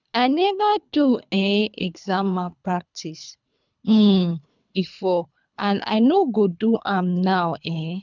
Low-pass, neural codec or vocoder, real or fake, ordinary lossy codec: 7.2 kHz; codec, 24 kHz, 3 kbps, HILCodec; fake; none